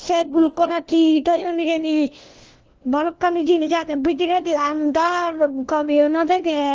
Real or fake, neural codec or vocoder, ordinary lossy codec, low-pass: fake; codec, 16 kHz, 1 kbps, FunCodec, trained on LibriTTS, 50 frames a second; Opus, 16 kbps; 7.2 kHz